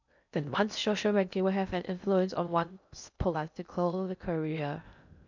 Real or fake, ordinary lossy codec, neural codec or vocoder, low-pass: fake; none; codec, 16 kHz in and 24 kHz out, 0.6 kbps, FocalCodec, streaming, 2048 codes; 7.2 kHz